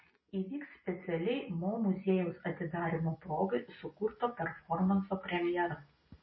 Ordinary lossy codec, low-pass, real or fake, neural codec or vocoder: MP3, 24 kbps; 7.2 kHz; real; none